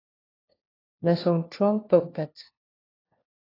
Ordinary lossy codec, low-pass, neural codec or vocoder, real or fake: AAC, 24 kbps; 5.4 kHz; codec, 16 kHz, 1 kbps, FunCodec, trained on LibriTTS, 50 frames a second; fake